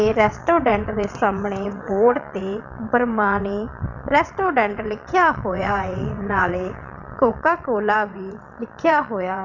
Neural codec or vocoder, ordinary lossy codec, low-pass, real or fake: vocoder, 22.05 kHz, 80 mel bands, WaveNeXt; none; 7.2 kHz; fake